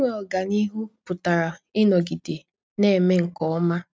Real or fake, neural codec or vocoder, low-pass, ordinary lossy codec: real; none; none; none